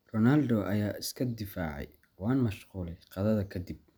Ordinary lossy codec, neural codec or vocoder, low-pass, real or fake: none; none; none; real